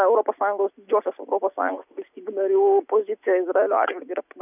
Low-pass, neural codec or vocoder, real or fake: 3.6 kHz; none; real